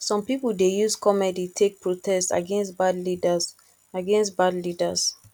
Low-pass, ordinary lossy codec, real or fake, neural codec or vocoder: 19.8 kHz; none; real; none